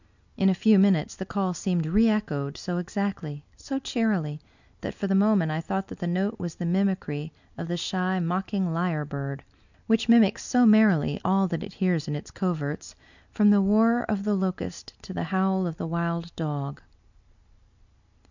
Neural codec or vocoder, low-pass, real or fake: none; 7.2 kHz; real